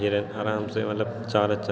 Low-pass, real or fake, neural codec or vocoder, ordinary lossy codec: none; real; none; none